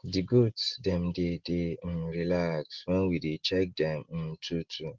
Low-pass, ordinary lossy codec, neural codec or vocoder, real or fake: 7.2 kHz; Opus, 16 kbps; none; real